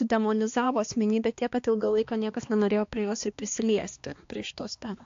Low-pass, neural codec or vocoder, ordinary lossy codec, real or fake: 7.2 kHz; codec, 16 kHz, 2 kbps, X-Codec, HuBERT features, trained on balanced general audio; AAC, 48 kbps; fake